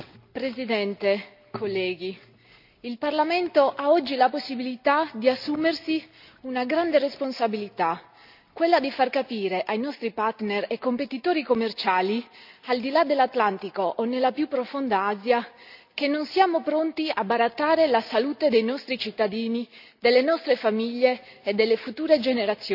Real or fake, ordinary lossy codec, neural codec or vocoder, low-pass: real; none; none; 5.4 kHz